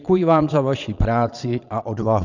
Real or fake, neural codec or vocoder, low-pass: fake; vocoder, 22.05 kHz, 80 mel bands, Vocos; 7.2 kHz